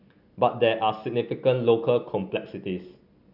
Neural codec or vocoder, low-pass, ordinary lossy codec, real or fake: none; 5.4 kHz; none; real